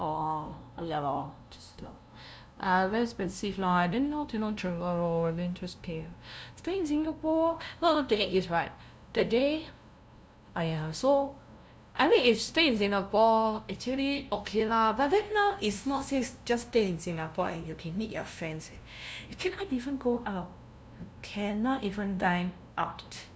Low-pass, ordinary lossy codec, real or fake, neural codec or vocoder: none; none; fake; codec, 16 kHz, 0.5 kbps, FunCodec, trained on LibriTTS, 25 frames a second